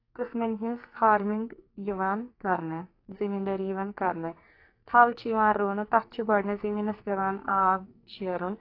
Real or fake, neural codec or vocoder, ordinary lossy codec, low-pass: fake; codec, 44.1 kHz, 2.6 kbps, SNAC; AAC, 32 kbps; 5.4 kHz